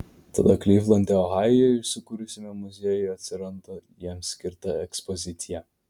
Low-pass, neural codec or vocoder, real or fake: 19.8 kHz; none; real